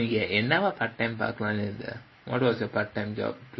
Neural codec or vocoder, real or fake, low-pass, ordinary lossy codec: none; real; 7.2 kHz; MP3, 24 kbps